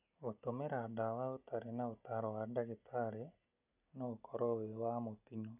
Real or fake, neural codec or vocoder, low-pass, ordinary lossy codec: real; none; 3.6 kHz; none